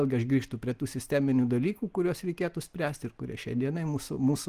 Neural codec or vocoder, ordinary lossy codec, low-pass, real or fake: none; Opus, 24 kbps; 14.4 kHz; real